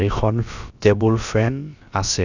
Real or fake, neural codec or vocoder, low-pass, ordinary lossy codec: fake; codec, 16 kHz, about 1 kbps, DyCAST, with the encoder's durations; 7.2 kHz; none